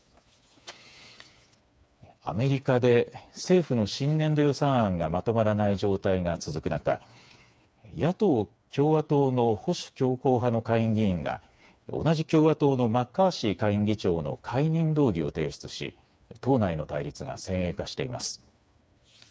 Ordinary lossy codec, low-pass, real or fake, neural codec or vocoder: none; none; fake; codec, 16 kHz, 4 kbps, FreqCodec, smaller model